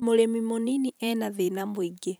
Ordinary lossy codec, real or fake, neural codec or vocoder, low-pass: none; fake; vocoder, 44.1 kHz, 128 mel bands every 512 samples, BigVGAN v2; none